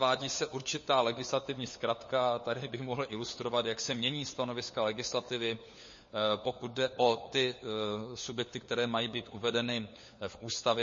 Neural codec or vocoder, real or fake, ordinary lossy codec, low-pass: codec, 16 kHz, 4 kbps, FunCodec, trained on LibriTTS, 50 frames a second; fake; MP3, 32 kbps; 7.2 kHz